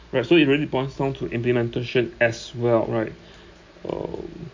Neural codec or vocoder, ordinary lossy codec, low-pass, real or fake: none; MP3, 48 kbps; 7.2 kHz; real